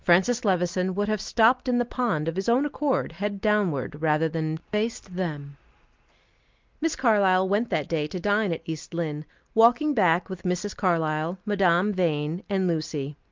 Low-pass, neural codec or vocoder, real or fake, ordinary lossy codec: 7.2 kHz; none; real; Opus, 32 kbps